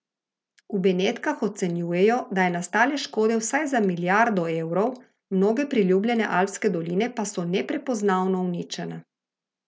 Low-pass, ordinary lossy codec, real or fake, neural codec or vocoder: none; none; real; none